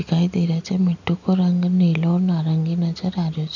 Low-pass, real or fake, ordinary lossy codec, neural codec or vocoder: 7.2 kHz; real; none; none